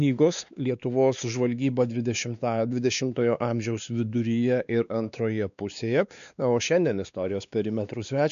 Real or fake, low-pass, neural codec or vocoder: fake; 7.2 kHz; codec, 16 kHz, 2 kbps, X-Codec, WavLM features, trained on Multilingual LibriSpeech